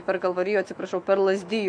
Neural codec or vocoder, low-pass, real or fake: autoencoder, 48 kHz, 128 numbers a frame, DAC-VAE, trained on Japanese speech; 9.9 kHz; fake